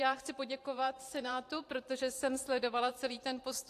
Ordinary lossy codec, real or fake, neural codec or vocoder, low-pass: AAC, 64 kbps; fake; vocoder, 44.1 kHz, 128 mel bands every 512 samples, BigVGAN v2; 14.4 kHz